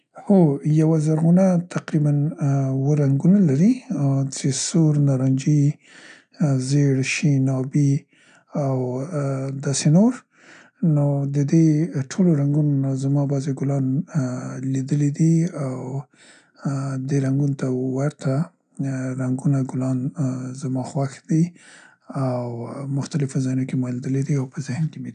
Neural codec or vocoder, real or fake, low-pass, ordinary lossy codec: none; real; 9.9 kHz; none